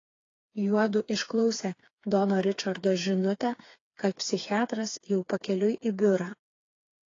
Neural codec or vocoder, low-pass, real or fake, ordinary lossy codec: codec, 16 kHz, 4 kbps, FreqCodec, smaller model; 7.2 kHz; fake; AAC, 32 kbps